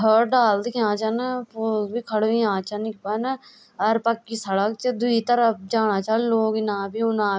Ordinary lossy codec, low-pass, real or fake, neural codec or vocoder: none; none; real; none